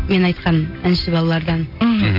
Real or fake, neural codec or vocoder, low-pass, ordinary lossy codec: real; none; 5.4 kHz; MP3, 32 kbps